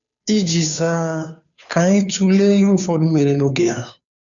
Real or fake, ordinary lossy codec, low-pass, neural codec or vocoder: fake; none; 7.2 kHz; codec, 16 kHz, 2 kbps, FunCodec, trained on Chinese and English, 25 frames a second